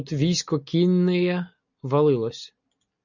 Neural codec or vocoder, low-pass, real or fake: none; 7.2 kHz; real